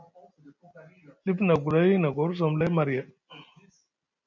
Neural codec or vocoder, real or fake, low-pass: none; real; 7.2 kHz